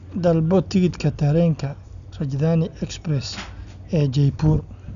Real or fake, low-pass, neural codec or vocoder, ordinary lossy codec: real; 7.2 kHz; none; none